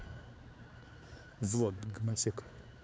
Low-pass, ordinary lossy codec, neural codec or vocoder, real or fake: none; none; codec, 16 kHz, 4 kbps, X-Codec, HuBERT features, trained on balanced general audio; fake